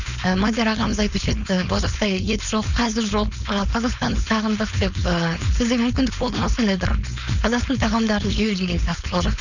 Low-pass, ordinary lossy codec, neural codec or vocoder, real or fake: 7.2 kHz; none; codec, 16 kHz, 4.8 kbps, FACodec; fake